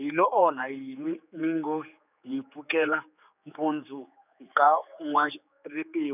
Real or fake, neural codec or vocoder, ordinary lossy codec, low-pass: fake; codec, 16 kHz, 4 kbps, X-Codec, HuBERT features, trained on general audio; none; 3.6 kHz